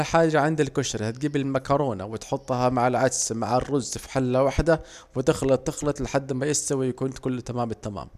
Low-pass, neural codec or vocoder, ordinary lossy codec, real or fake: 14.4 kHz; none; none; real